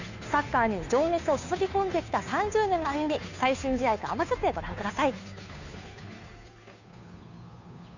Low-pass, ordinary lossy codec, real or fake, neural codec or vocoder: 7.2 kHz; none; fake; codec, 16 kHz, 2 kbps, FunCodec, trained on Chinese and English, 25 frames a second